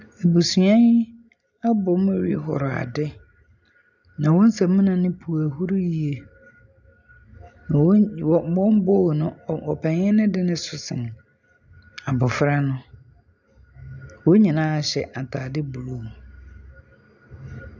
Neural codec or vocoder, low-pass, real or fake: none; 7.2 kHz; real